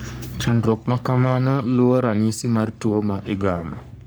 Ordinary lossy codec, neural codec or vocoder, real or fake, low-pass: none; codec, 44.1 kHz, 3.4 kbps, Pupu-Codec; fake; none